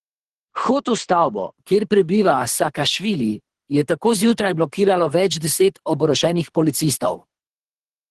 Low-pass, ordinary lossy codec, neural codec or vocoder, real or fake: 10.8 kHz; Opus, 16 kbps; codec, 24 kHz, 3 kbps, HILCodec; fake